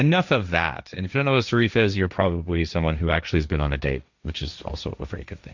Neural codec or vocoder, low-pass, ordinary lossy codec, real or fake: codec, 16 kHz, 1.1 kbps, Voila-Tokenizer; 7.2 kHz; Opus, 64 kbps; fake